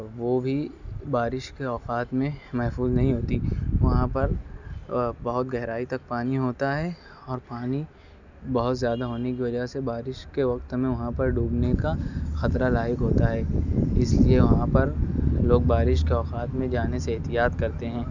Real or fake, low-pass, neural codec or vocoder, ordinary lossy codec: real; 7.2 kHz; none; none